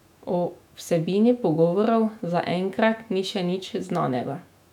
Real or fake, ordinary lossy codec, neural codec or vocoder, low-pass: fake; none; autoencoder, 48 kHz, 128 numbers a frame, DAC-VAE, trained on Japanese speech; 19.8 kHz